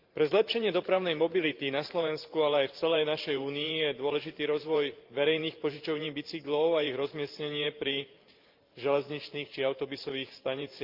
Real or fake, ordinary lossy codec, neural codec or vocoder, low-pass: fake; Opus, 24 kbps; vocoder, 44.1 kHz, 128 mel bands every 512 samples, BigVGAN v2; 5.4 kHz